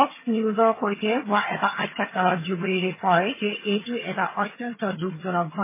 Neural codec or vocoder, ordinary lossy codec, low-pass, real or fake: vocoder, 22.05 kHz, 80 mel bands, HiFi-GAN; MP3, 16 kbps; 3.6 kHz; fake